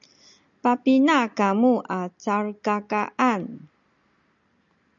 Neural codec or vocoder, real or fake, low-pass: none; real; 7.2 kHz